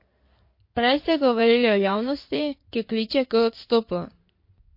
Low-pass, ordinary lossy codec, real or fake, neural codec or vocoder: 5.4 kHz; MP3, 32 kbps; fake; codec, 16 kHz in and 24 kHz out, 2.2 kbps, FireRedTTS-2 codec